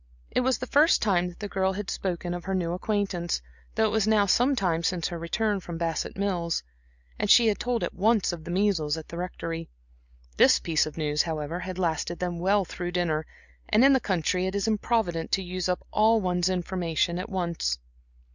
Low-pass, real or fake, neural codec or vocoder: 7.2 kHz; real; none